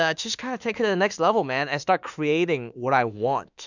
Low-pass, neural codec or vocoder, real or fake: 7.2 kHz; autoencoder, 48 kHz, 32 numbers a frame, DAC-VAE, trained on Japanese speech; fake